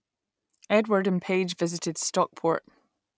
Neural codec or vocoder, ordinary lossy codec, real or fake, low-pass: none; none; real; none